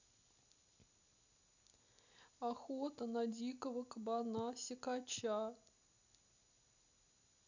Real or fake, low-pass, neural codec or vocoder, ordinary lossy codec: real; 7.2 kHz; none; none